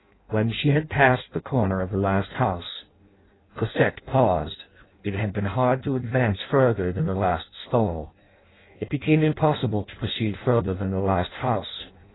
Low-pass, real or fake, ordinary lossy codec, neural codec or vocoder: 7.2 kHz; fake; AAC, 16 kbps; codec, 16 kHz in and 24 kHz out, 0.6 kbps, FireRedTTS-2 codec